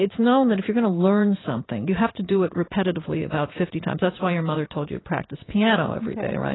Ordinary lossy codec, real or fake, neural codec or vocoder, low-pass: AAC, 16 kbps; fake; vocoder, 44.1 kHz, 80 mel bands, Vocos; 7.2 kHz